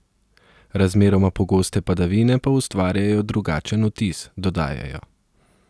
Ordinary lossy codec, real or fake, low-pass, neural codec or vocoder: none; real; none; none